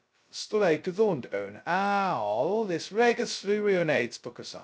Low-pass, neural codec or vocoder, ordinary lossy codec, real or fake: none; codec, 16 kHz, 0.2 kbps, FocalCodec; none; fake